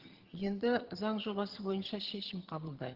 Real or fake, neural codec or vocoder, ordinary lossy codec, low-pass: fake; vocoder, 22.05 kHz, 80 mel bands, HiFi-GAN; Opus, 16 kbps; 5.4 kHz